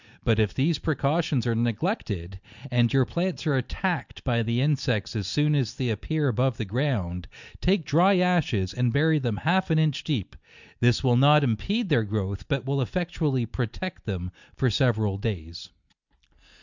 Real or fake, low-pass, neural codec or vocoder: real; 7.2 kHz; none